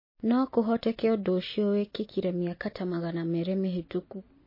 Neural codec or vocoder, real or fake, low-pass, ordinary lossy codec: none; real; 5.4 kHz; MP3, 24 kbps